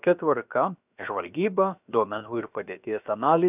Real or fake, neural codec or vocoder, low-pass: fake; codec, 16 kHz, 0.7 kbps, FocalCodec; 3.6 kHz